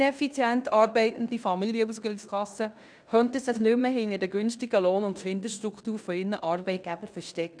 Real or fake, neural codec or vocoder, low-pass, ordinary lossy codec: fake; codec, 16 kHz in and 24 kHz out, 0.9 kbps, LongCat-Audio-Codec, fine tuned four codebook decoder; 9.9 kHz; none